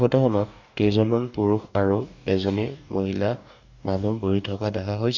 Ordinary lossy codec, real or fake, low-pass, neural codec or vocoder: none; fake; 7.2 kHz; codec, 44.1 kHz, 2.6 kbps, DAC